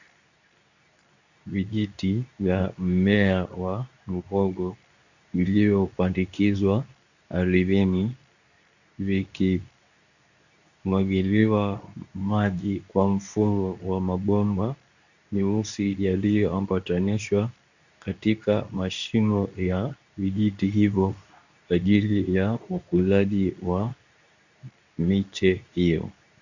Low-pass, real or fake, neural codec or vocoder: 7.2 kHz; fake; codec, 24 kHz, 0.9 kbps, WavTokenizer, medium speech release version 2